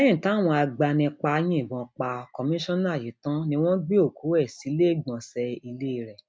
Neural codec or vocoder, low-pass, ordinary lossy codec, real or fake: none; none; none; real